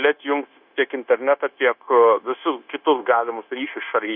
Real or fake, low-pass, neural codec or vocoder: fake; 5.4 kHz; codec, 16 kHz, 0.9 kbps, LongCat-Audio-Codec